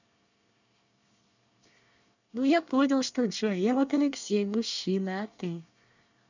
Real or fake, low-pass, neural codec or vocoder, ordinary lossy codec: fake; 7.2 kHz; codec, 24 kHz, 1 kbps, SNAC; none